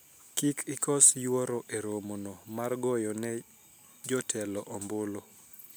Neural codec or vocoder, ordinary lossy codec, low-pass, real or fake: none; none; none; real